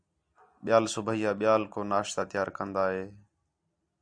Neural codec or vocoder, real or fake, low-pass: none; real; 9.9 kHz